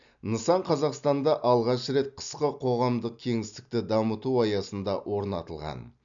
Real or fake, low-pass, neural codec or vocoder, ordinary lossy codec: real; 7.2 kHz; none; none